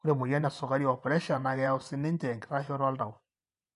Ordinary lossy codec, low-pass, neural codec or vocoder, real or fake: none; 10.8 kHz; vocoder, 24 kHz, 100 mel bands, Vocos; fake